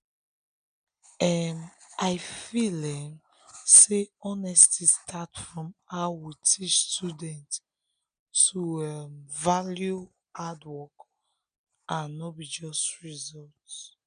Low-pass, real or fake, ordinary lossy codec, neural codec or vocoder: 9.9 kHz; real; none; none